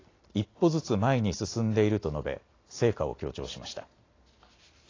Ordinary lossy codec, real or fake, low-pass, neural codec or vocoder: AAC, 32 kbps; real; 7.2 kHz; none